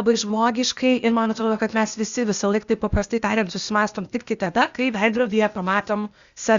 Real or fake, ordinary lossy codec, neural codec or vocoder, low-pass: fake; Opus, 64 kbps; codec, 16 kHz, 0.8 kbps, ZipCodec; 7.2 kHz